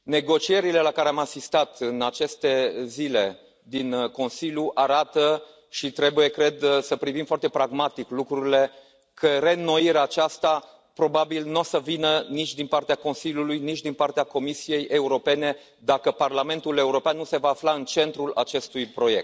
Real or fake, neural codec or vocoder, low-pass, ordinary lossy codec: real; none; none; none